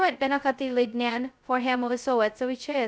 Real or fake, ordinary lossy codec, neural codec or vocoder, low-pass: fake; none; codec, 16 kHz, 0.2 kbps, FocalCodec; none